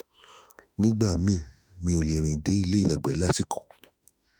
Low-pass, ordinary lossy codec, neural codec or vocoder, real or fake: none; none; autoencoder, 48 kHz, 32 numbers a frame, DAC-VAE, trained on Japanese speech; fake